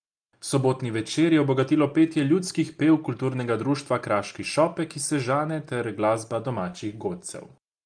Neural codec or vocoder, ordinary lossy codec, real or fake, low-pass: none; Opus, 32 kbps; real; 9.9 kHz